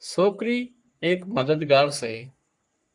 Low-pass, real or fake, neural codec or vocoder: 10.8 kHz; fake; codec, 44.1 kHz, 3.4 kbps, Pupu-Codec